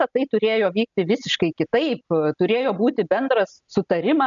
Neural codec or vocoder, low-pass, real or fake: none; 7.2 kHz; real